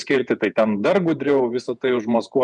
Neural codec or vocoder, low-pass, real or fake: vocoder, 48 kHz, 128 mel bands, Vocos; 10.8 kHz; fake